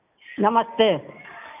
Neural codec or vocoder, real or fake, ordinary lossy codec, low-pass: none; real; none; 3.6 kHz